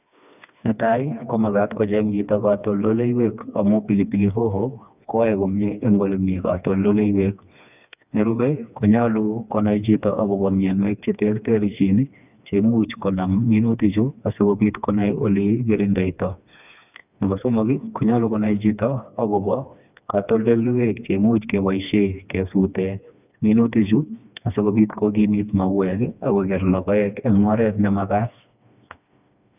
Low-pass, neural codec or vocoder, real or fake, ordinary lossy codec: 3.6 kHz; codec, 16 kHz, 2 kbps, FreqCodec, smaller model; fake; none